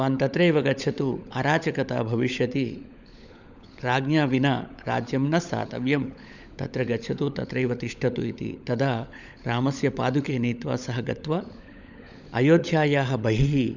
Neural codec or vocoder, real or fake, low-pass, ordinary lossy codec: codec, 16 kHz, 16 kbps, FunCodec, trained on LibriTTS, 50 frames a second; fake; 7.2 kHz; none